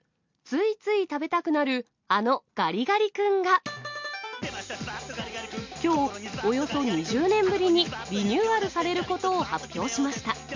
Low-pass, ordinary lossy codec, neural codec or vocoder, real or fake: 7.2 kHz; MP3, 64 kbps; none; real